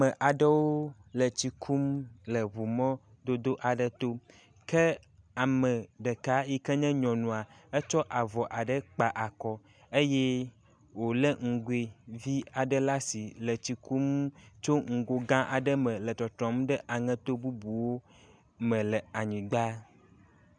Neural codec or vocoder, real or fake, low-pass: none; real; 9.9 kHz